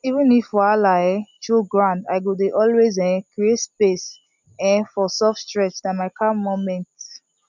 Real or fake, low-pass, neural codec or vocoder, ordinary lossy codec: real; 7.2 kHz; none; none